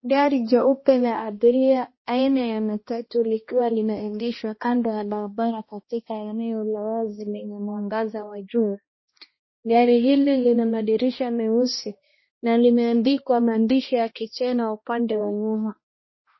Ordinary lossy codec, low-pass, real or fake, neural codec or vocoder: MP3, 24 kbps; 7.2 kHz; fake; codec, 16 kHz, 1 kbps, X-Codec, HuBERT features, trained on balanced general audio